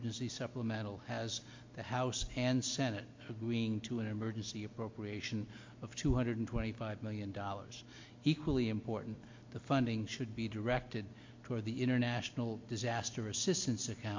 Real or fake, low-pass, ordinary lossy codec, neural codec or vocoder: real; 7.2 kHz; MP3, 48 kbps; none